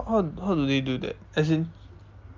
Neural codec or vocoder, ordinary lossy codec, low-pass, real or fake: none; Opus, 32 kbps; 7.2 kHz; real